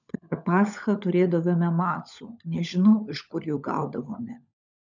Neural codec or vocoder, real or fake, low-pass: codec, 16 kHz, 16 kbps, FunCodec, trained on LibriTTS, 50 frames a second; fake; 7.2 kHz